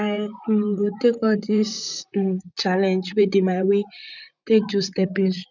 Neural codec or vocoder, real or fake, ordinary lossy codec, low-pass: vocoder, 44.1 kHz, 128 mel bands, Pupu-Vocoder; fake; none; 7.2 kHz